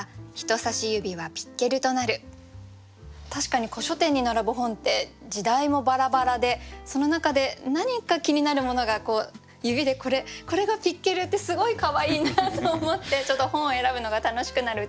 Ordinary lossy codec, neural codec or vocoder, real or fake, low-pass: none; none; real; none